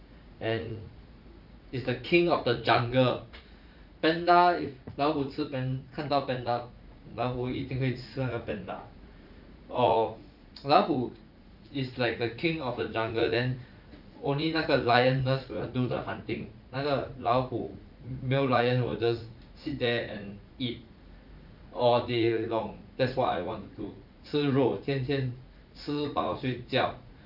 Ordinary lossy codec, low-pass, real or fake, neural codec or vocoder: none; 5.4 kHz; fake; vocoder, 44.1 kHz, 80 mel bands, Vocos